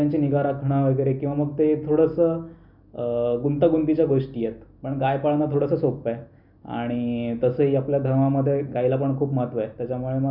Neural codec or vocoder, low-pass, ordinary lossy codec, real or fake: none; 5.4 kHz; none; real